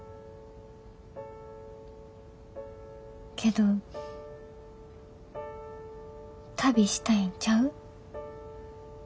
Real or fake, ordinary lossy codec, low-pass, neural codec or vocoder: real; none; none; none